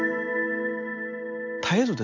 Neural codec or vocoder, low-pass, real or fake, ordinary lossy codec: none; 7.2 kHz; real; AAC, 48 kbps